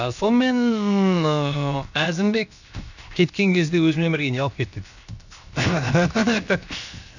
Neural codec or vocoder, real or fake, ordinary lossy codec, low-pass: codec, 16 kHz, 0.7 kbps, FocalCodec; fake; none; 7.2 kHz